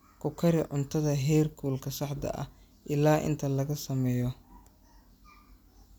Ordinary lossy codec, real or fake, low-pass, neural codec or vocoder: none; real; none; none